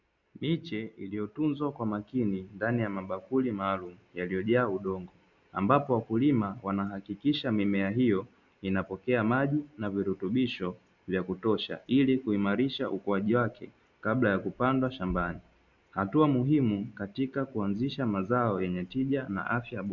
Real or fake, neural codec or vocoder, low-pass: real; none; 7.2 kHz